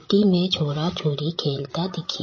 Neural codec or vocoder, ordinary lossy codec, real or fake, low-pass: codec, 16 kHz, 16 kbps, FreqCodec, larger model; MP3, 32 kbps; fake; 7.2 kHz